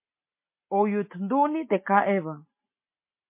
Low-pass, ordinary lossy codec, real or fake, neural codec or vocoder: 3.6 kHz; MP3, 24 kbps; real; none